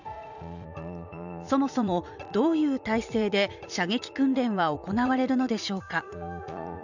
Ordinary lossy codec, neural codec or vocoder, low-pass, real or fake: none; vocoder, 44.1 kHz, 80 mel bands, Vocos; 7.2 kHz; fake